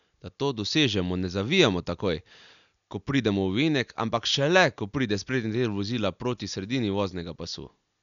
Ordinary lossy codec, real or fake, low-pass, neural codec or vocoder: none; real; 7.2 kHz; none